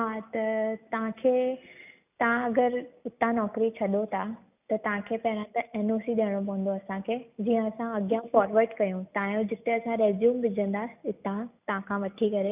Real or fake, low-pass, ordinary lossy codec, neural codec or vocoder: real; 3.6 kHz; none; none